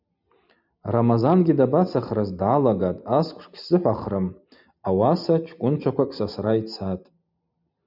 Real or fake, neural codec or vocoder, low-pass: real; none; 5.4 kHz